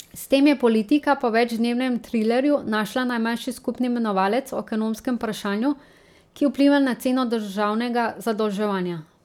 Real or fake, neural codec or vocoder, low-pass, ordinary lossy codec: real; none; 19.8 kHz; none